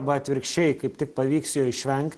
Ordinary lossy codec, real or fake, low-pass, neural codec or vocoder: Opus, 16 kbps; real; 10.8 kHz; none